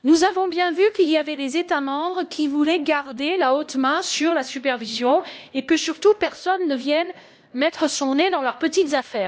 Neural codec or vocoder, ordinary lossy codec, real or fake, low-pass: codec, 16 kHz, 1 kbps, X-Codec, HuBERT features, trained on LibriSpeech; none; fake; none